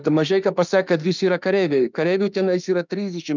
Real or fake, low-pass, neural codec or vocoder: fake; 7.2 kHz; autoencoder, 48 kHz, 32 numbers a frame, DAC-VAE, trained on Japanese speech